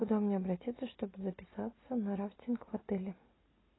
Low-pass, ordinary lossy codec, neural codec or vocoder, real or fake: 7.2 kHz; AAC, 16 kbps; none; real